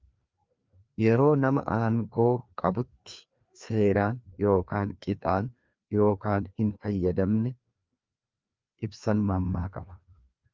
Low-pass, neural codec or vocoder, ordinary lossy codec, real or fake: 7.2 kHz; codec, 16 kHz, 2 kbps, FreqCodec, larger model; Opus, 24 kbps; fake